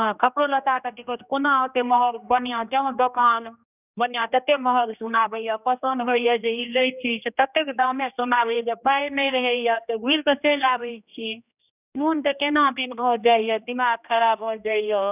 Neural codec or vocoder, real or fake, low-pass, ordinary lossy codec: codec, 16 kHz, 1 kbps, X-Codec, HuBERT features, trained on general audio; fake; 3.6 kHz; none